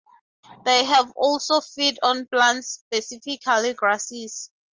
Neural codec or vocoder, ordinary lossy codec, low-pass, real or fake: none; Opus, 32 kbps; 7.2 kHz; real